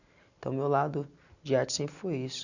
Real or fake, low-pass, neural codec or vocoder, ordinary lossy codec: real; 7.2 kHz; none; none